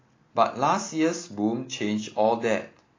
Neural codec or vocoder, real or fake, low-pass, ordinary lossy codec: none; real; 7.2 kHz; AAC, 32 kbps